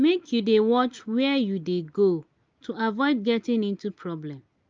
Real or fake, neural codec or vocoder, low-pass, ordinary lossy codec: real; none; 7.2 kHz; Opus, 24 kbps